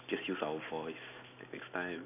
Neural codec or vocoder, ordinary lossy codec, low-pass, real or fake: none; none; 3.6 kHz; real